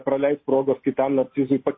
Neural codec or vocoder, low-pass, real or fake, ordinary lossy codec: none; 7.2 kHz; real; MP3, 24 kbps